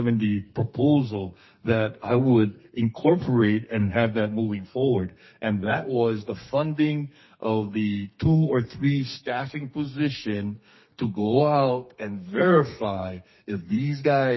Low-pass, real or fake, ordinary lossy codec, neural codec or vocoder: 7.2 kHz; fake; MP3, 24 kbps; codec, 32 kHz, 1.9 kbps, SNAC